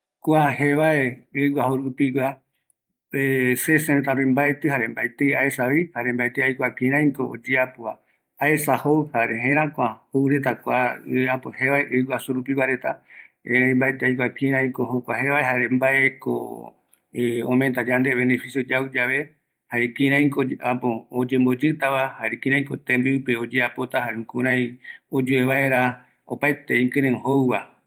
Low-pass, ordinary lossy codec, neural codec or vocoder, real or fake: 19.8 kHz; Opus, 32 kbps; vocoder, 44.1 kHz, 128 mel bands every 512 samples, BigVGAN v2; fake